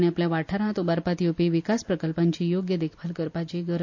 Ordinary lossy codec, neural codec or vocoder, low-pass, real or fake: AAC, 48 kbps; none; 7.2 kHz; real